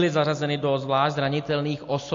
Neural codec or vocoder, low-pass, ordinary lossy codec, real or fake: none; 7.2 kHz; AAC, 48 kbps; real